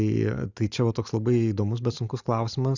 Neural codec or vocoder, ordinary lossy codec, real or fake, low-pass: none; Opus, 64 kbps; real; 7.2 kHz